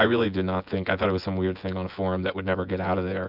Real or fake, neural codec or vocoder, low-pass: fake; vocoder, 24 kHz, 100 mel bands, Vocos; 5.4 kHz